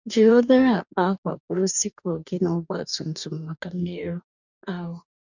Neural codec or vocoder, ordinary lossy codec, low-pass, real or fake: codec, 44.1 kHz, 2.6 kbps, DAC; none; 7.2 kHz; fake